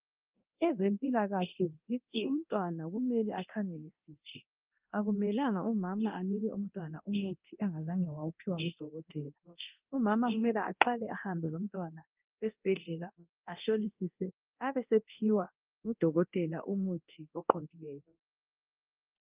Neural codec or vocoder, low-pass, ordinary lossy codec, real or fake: codec, 24 kHz, 0.9 kbps, DualCodec; 3.6 kHz; Opus, 24 kbps; fake